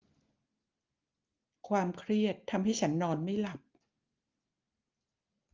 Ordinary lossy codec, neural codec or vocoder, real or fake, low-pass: Opus, 32 kbps; none; real; 7.2 kHz